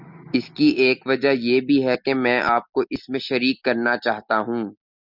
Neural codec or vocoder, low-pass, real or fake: none; 5.4 kHz; real